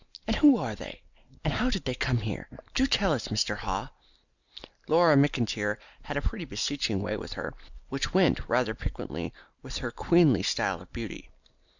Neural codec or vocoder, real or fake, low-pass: none; real; 7.2 kHz